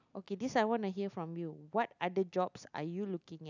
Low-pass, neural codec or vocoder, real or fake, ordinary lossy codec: 7.2 kHz; none; real; none